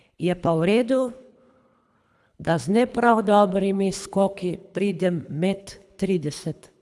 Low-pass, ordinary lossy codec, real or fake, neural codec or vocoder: none; none; fake; codec, 24 kHz, 3 kbps, HILCodec